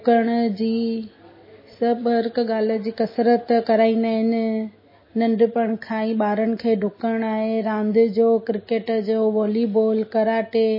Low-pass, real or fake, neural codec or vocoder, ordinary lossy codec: 5.4 kHz; real; none; MP3, 24 kbps